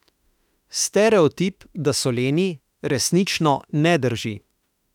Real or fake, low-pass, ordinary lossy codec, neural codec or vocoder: fake; 19.8 kHz; none; autoencoder, 48 kHz, 32 numbers a frame, DAC-VAE, trained on Japanese speech